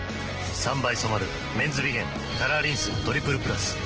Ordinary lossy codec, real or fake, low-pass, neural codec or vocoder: Opus, 16 kbps; real; 7.2 kHz; none